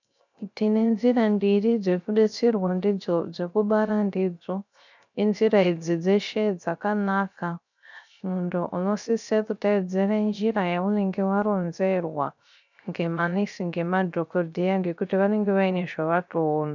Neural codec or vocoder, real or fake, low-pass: codec, 16 kHz, 0.3 kbps, FocalCodec; fake; 7.2 kHz